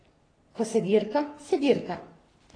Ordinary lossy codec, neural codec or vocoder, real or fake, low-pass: AAC, 32 kbps; codec, 44.1 kHz, 3.4 kbps, Pupu-Codec; fake; 9.9 kHz